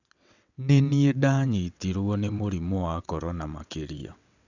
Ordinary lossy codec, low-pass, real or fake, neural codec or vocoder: none; 7.2 kHz; fake; vocoder, 22.05 kHz, 80 mel bands, WaveNeXt